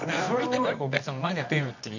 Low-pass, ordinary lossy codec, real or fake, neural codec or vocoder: 7.2 kHz; none; fake; codec, 24 kHz, 0.9 kbps, WavTokenizer, medium music audio release